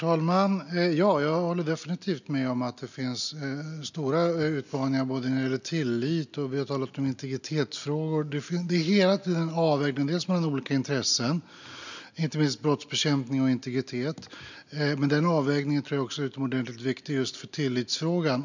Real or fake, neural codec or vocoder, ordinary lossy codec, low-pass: real; none; none; 7.2 kHz